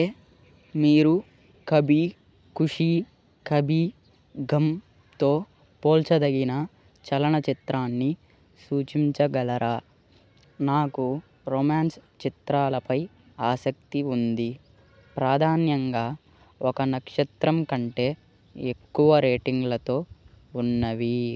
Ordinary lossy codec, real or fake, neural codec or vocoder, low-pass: none; real; none; none